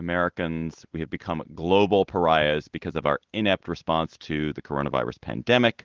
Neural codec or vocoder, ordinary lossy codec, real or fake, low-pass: none; Opus, 16 kbps; real; 7.2 kHz